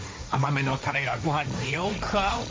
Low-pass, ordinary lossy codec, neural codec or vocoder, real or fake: none; none; codec, 16 kHz, 1.1 kbps, Voila-Tokenizer; fake